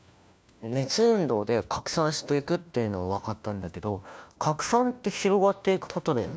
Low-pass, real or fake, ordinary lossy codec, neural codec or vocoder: none; fake; none; codec, 16 kHz, 1 kbps, FunCodec, trained on LibriTTS, 50 frames a second